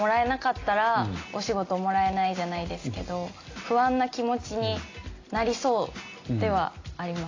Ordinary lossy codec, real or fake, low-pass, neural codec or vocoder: AAC, 32 kbps; real; 7.2 kHz; none